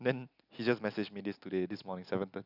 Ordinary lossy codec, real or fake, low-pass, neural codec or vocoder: none; real; 5.4 kHz; none